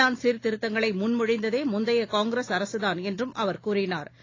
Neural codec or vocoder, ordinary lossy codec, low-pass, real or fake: none; AAC, 32 kbps; 7.2 kHz; real